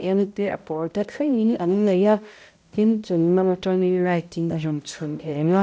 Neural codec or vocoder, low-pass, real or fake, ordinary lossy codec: codec, 16 kHz, 0.5 kbps, X-Codec, HuBERT features, trained on balanced general audio; none; fake; none